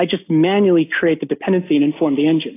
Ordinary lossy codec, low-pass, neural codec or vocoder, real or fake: AAC, 24 kbps; 3.6 kHz; none; real